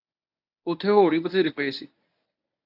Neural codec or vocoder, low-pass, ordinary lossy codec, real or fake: codec, 24 kHz, 0.9 kbps, WavTokenizer, medium speech release version 1; 5.4 kHz; AAC, 48 kbps; fake